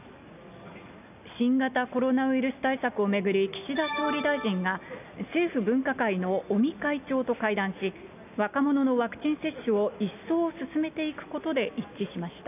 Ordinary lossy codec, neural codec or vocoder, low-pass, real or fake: none; none; 3.6 kHz; real